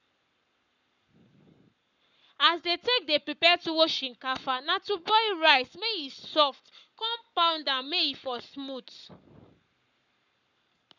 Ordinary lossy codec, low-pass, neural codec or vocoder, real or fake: none; 7.2 kHz; codec, 44.1 kHz, 7.8 kbps, Pupu-Codec; fake